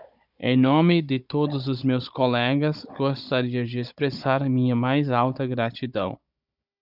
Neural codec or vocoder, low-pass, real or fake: codec, 16 kHz, 16 kbps, FunCodec, trained on Chinese and English, 50 frames a second; 5.4 kHz; fake